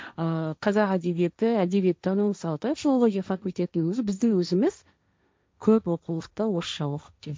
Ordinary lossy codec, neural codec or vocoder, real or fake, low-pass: none; codec, 16 kHz, 1.1 kbps, Voila-Tokenizer; fake; none